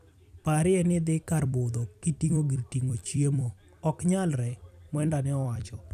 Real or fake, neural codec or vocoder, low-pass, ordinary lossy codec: fake; vocoder, 44.1 kHz, 128 mel bands every 256 samples, BigVGAN v2; 14.4 kHz; none